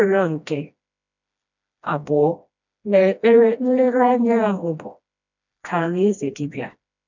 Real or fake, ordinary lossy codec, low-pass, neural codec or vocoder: fake; none; 7.2 kHz; codec, 16 kHz, 1 kbps, FreqCodec, smaller model